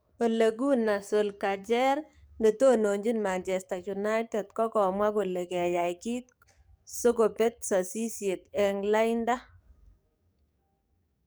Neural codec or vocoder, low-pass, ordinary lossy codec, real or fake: codec, 44.1 kHz, 7.8 kbps, DAC; none; none; fake